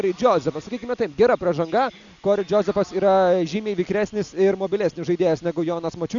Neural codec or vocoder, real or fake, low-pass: none; real; 7.2 kHz